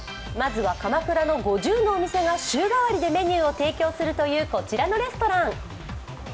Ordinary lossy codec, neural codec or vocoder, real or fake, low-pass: none; none; real; none